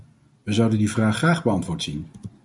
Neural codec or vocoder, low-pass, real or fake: none; 10.8 kHz; real